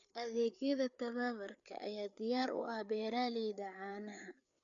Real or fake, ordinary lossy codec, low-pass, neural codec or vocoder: fake; none; 7.2 kHz; codec, 16 kHz, 4 kbps, FreqCodec, larger model